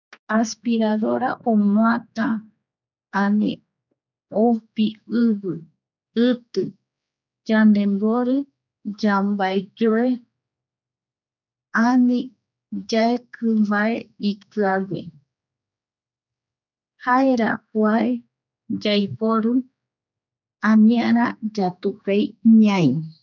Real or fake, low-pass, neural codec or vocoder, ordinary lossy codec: fake; 7.2 kHz; codec, 16 kHz, 4 kbps, X-Codec, HuBERT features, trained on general audio; none